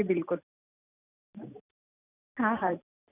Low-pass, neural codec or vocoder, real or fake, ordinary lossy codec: 3.6 kHz; none; real; none